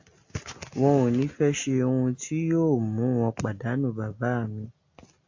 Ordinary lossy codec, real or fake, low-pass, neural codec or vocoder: AAC, 48 kbps; real; 7.2 kHz; none